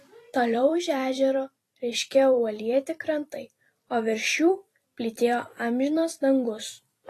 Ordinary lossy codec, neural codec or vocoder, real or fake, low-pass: AAC, 48 kbps; vocoder, 44.1 kHz, 128 mel bands every 256 samples, BigVGAN v2; fake; 14.4 kHz